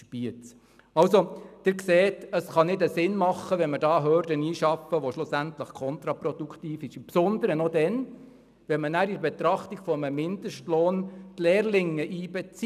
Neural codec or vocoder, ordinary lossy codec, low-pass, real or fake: none; none; 14.4 kHz; real